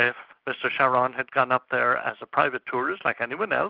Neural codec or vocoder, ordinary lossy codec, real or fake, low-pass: none; Opus, 24 kbps; real; 5.4 kHz